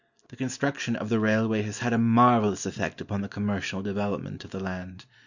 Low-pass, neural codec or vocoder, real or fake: 7.2 kHz; none; real